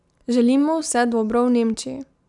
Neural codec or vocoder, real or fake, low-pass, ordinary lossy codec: none; real; 10.8 kHz; none